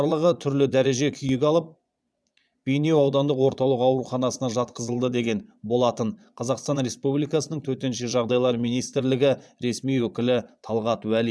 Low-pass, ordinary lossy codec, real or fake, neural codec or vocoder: none; none; fake; vocoder, 22.05 kHz, 80 mel bands, Vocos